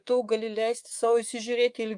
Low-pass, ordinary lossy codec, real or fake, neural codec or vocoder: 10.8 kHz; Opus, 32 kbps; fake; codec, 24 kHz, 3.1 kbps, DualCodec